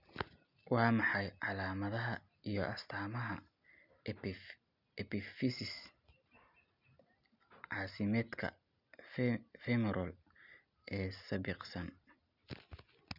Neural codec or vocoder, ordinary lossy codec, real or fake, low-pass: none; none; real; 5.4 kHz